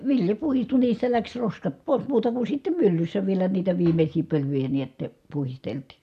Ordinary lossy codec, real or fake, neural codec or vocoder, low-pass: none; real; none; 14.4 kHz